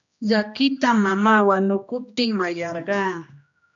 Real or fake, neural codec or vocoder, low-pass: fake; codec, 16 kHz, 1 kbps, X-Codec, HuBERT features, trained on general audio; 7.2 kHz